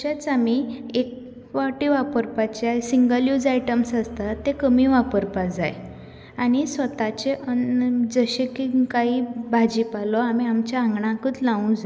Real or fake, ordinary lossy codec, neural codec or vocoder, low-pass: real; none; none; none